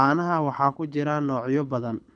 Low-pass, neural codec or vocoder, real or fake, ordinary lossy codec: 9.9 kHz; codec, 24 kHz, 6 kbps, HILCodec; fake; none